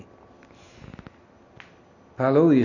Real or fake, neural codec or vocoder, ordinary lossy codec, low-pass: real; none; none; 7.2 kHz